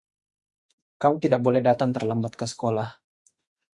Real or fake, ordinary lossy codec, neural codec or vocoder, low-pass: fake; Opus, 64 kbps; autoencoder, 48 kHz, 32 numbers a frame, DAC-VAE, trained on Japanese speech; 10.8 kHz